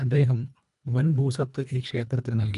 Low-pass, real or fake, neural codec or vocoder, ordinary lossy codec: 10.8 kHz; fake; codec, 24 kHz, 1.5 kbps, HILCodec; none